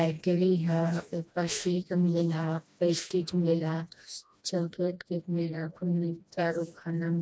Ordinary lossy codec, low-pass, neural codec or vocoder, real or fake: none; none; codec, 16 kHz, 1 kbps, FreqCodec, smaller model; fake